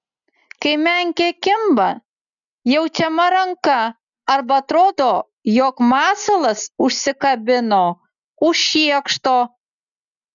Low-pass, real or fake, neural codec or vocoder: 7.2 kHz; real; none